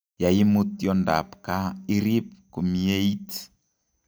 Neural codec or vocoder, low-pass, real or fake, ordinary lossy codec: none; none; real; none